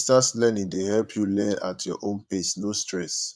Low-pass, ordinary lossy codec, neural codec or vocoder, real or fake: none; none; vocoder, 22.05 kHz, 80 mel bands, Vocos; fake